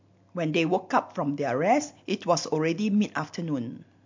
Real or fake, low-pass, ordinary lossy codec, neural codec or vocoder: real; 7.2 kHz; MP3, 48 kbps; none